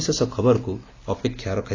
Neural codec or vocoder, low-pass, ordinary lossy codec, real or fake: none; 7.2 kHz; AAC, 32 kbps; real